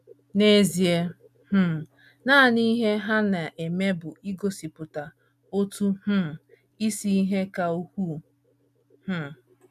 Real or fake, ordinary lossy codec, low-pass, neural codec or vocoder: real; none; 14.4 kHz; none